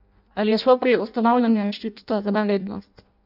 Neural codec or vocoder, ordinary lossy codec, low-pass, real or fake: codec, 16 kHz in and 24 kHz out, 0.6 kbps, FireRedTTS-2 codec; none; 5.4 kHz; fake